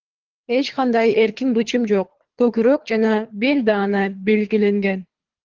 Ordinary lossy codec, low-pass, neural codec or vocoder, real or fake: Opus, 16 kbps; 7.2 kHz; codec, 24 kHz, 3 kbps, HILCodec; fake